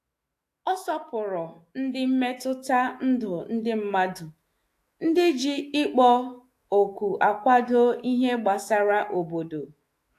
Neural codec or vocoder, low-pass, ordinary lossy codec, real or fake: autoencoder, 48 kHz, 128 numbers a frame, DAC-VAE, trained on Japanese speech; 14.4 kHz; AAC, 64 kbps; fake